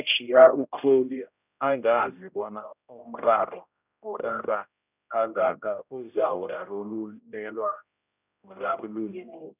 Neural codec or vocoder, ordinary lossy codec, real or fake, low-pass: codec, 16 kHz, 0.5 kbps, X-Codec, HuBERT features, trained on general audio; none; fake; 3.6 kHz